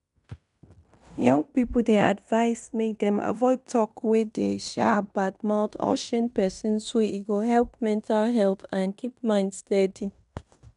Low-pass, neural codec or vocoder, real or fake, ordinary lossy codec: 10.8 kHz; codec, 16 kHz in and 24 kHz out, 0.9 kbps, LongCat-Audio-Codec, fine tuned four codebook decoder; fake; none